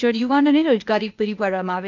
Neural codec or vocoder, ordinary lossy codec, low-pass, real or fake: codec, 16 kHz, 0.8 kbps, ZipCodec; none; 7.2 kHz; fake